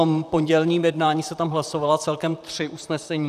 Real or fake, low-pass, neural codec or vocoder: fake; 14.4 kHz; vocoder, 44.1 kHz, 128 mel bands, Pupu-Vocoder